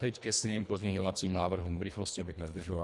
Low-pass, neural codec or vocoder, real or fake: 10.8 kHz; codec, 24 kHz, 1.5 kbps, HILCodec; fake